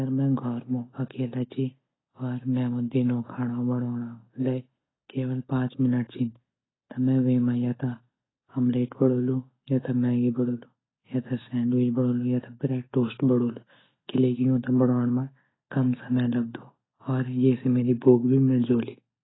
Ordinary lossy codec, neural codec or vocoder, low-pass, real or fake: AAC, 16 kbps; none; 7.2 kHz; real